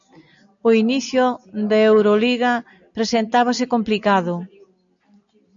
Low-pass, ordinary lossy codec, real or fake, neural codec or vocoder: 7.2 kHz; MP3, 96 kbps; real; none